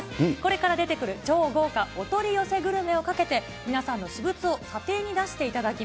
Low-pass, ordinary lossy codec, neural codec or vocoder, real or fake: none; none; none; real